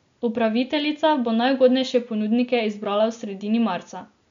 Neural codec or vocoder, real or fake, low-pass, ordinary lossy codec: none; real; 7.2 kHz; MP3, 64 kbps